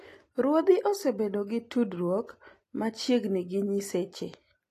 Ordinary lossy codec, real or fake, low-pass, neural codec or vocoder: AAC, 48 kbps; real; 14.4 kHz; none